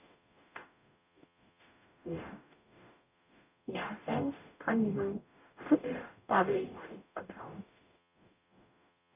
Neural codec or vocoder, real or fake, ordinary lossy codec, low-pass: codec, 44.1 kHz, 0.9 kbps, DAC; fake; none; 3.6 kHz